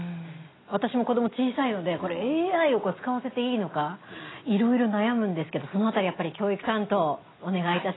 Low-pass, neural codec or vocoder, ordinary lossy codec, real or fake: 7.2 kHz; none; AAC, 16 kbps; real